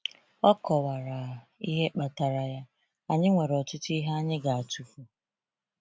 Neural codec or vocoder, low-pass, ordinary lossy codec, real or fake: none; none; none; real